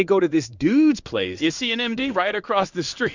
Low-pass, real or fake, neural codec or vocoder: 7.2 kHz; fake; codec, 16 kHz in and 24 kHz out, 1 kbps, XY-Tokenizer